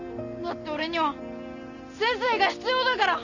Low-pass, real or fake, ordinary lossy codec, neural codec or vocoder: 7.2 kHz; real; none; none